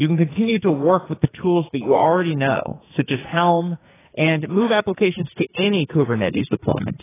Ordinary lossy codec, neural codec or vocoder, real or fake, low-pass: AAC, 16 kbps; codec, 44.1 kHz, 2.6 kbps, SNAC; fake; 3.6 kHz